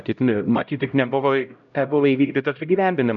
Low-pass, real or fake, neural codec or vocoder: 7.2 kHz; fake; codec, 16 kHz, 0.5 kbps, X-Codec, HuBERT features, trained on LibriSpeech